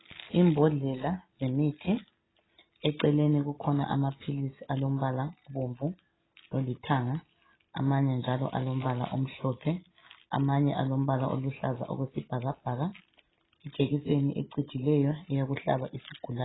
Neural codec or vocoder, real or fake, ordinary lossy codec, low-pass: none; real; AAC, 16 kbps; 7.2 kHz